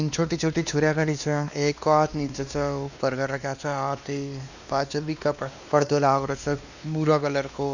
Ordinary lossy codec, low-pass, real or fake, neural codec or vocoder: none; 7.2 kHz; fake; codec, 16 kHz, 2 kbps, X-Codec, WavLM features, trained on Multilingual LibriSpeech